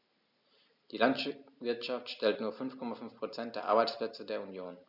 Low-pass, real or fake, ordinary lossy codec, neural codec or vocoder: 5.4 kHz; real; none; none